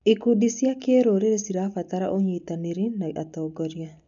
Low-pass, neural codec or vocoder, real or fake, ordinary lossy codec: 7.2 kHz; none; real; MP3, 96 kbps